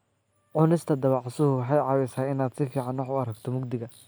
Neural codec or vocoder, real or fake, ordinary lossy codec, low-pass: none; real; none; none